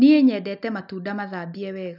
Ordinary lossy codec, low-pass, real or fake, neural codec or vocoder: none; 5.4 kHz; real; none